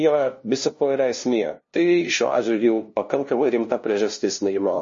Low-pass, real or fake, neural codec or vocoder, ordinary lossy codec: 7.2 kHz; fake; codec, 16 kHz, 0.5 kbps, FunCodec, trained on LibriTTS, 25 frames a second; MP3, 32 kbps